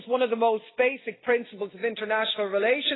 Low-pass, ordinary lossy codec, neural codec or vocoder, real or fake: 7.2 kHz; AAC, 16 kbps; none; real